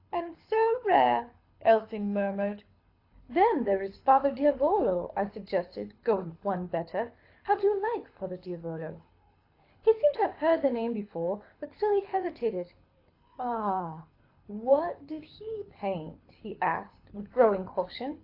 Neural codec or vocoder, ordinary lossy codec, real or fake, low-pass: codec, 24 kHz, 6 kbps, HILCodec; AAC, 32 kbps; fake; 5.4 kHz